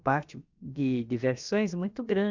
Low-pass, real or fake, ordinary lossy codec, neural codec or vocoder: 7.2 kHz; fake; Opus, 64 kbps; codec, 16 kHz, about 1 kbps, DyCAST, with the encoder's durations